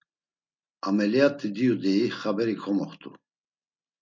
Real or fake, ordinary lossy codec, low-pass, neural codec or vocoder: real; MP3, 64 kbps; 7.2 kHz; none